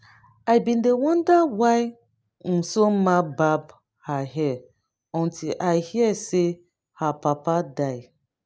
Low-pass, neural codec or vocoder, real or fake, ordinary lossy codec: none; none; real; none